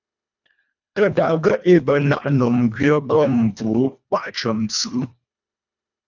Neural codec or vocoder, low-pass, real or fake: codec, 24 kHz, 1.5 kbps, HILCodec; 7.2 kHz; fake